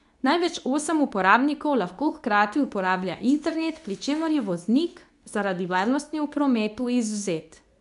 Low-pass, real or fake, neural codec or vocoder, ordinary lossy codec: 10.8 kHz; fake; codec, 24 kHz, 0.9 kbps, WavTokenizer, medium speech release version 2; none